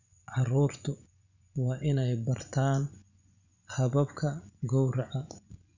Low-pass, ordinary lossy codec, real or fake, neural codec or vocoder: 7.2 kHz; none; fake; vocoder, 24 kHz, 100 mel bands, Vocos